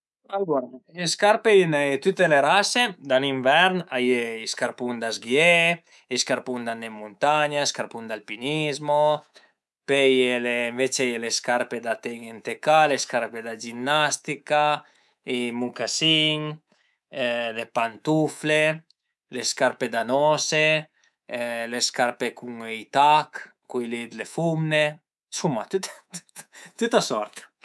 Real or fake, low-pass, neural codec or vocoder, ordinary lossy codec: fake; none; codec, 24 kHz, 3.1 kbps, DualCodec; none